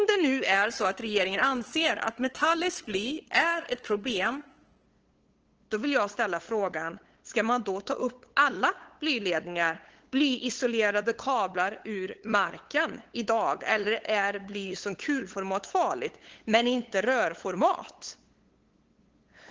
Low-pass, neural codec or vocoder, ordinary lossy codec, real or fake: 7.2 kHz; codec, 16 kHz, 8 kbps, FunCodec, trained on LibriTTS, 25 frames a second; Opus, 16 kbps; fake